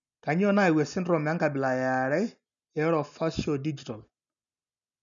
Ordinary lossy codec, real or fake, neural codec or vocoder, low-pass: none; real; none; 7.2 kHz